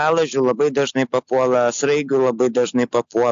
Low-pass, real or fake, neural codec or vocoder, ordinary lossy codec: 7.2 kHz; real; none; AAC, 64 kbps